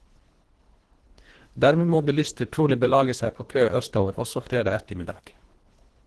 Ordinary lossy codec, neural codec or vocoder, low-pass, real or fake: Opus, 16 kbps; codec, 24 kHz, 1.5 kbps, HILCodec; 10.8 kHz; fake